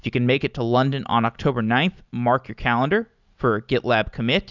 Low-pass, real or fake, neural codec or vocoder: 7.2 kHz; real; none